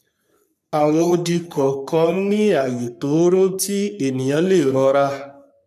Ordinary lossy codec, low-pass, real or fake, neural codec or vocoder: none; 14.4 kHz; fake; codec, 44.1 kHz, 3.4 kbps, Pupu-Codec